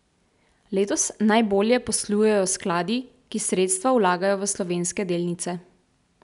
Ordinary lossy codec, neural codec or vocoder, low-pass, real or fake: none; none; 10.8 kHz; real